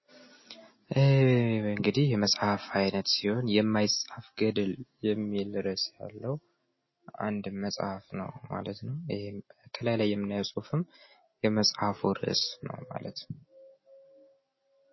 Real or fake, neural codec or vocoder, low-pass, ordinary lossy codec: real; none; 7.2 kHz; MP3, 24 kbps